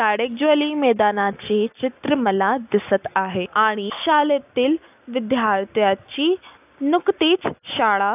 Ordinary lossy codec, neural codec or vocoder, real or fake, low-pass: AAC, 32 kbps; none; real; 3.6 kHz